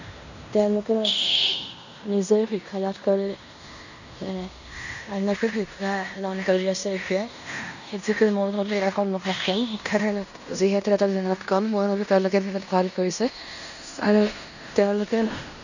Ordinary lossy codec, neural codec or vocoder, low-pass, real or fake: none; codec, 16 kHz in and 24 kHz out, 0.9 kbps, LongCat-Audio-Codec, fine tuned four codebook decoder; 7.2 kHz; fake